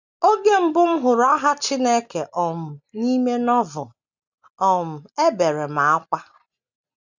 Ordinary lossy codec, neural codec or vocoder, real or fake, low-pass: none; none; real; 7.2 kHz